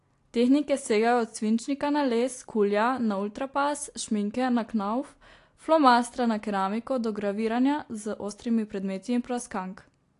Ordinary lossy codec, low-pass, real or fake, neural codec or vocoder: AAC, 48 kbps; 10.8 kHz; real; none